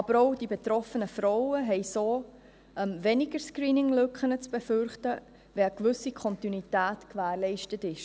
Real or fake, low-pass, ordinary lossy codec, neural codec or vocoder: real; none; none; none